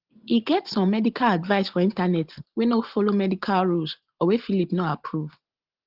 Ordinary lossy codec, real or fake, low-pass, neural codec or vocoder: Opus, 16 kbps; real; 5.4 kHz; none